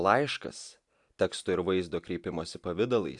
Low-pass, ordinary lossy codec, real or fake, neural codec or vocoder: 10.8 kHz; AAC, 64 kbps; real; none